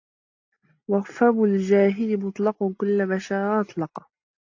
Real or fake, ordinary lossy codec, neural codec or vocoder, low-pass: real; AAC, 48 kbps; none; 7.2 kHz